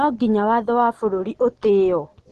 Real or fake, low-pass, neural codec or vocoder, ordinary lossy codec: real; 10.8 kHz; none; Opus, 16 kbps